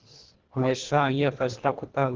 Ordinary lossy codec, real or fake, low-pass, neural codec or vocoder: Opus, 16 kbps; fake; 7.2 kHz; codec, 24 kHz, 1.5 kbps, HILCodec